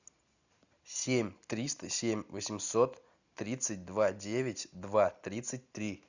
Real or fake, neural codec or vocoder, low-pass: real; none; 7.2 kHz